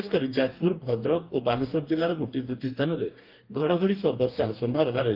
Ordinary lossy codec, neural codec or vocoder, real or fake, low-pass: Opus, 24 kbps; codec, 44.1 kHz, 2.6 kbps, DAC; fake; 5.4 kHz